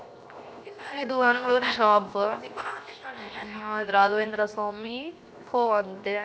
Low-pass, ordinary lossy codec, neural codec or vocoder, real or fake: none; none; codec, 16 kHz, 0.7 kbps, FocalCodec; fake